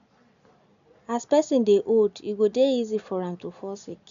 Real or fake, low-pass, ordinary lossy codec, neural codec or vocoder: real; 7.2 kHz; MP3, 96 kbps; none